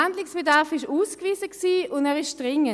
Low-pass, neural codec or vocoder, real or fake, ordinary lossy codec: none; none; real; none